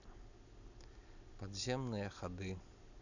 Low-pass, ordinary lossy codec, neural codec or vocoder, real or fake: 7.2 kHz; none; none; real